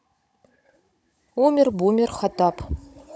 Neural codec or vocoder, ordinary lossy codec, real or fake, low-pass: codec, 16 kHz, 16 kbps, FunCodec, trained on Chinese and English, 50 frames a second; none; fake; none